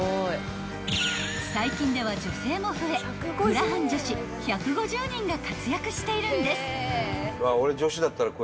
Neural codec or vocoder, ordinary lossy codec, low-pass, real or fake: none; none; none; real